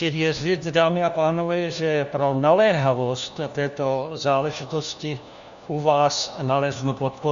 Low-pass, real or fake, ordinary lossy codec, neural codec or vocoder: 7.2 kHz; fake; Opus, 64 kbps; codec, 16 kHz, 1 kbps, FunCodec, trained on LibriTTS, 50 frames a second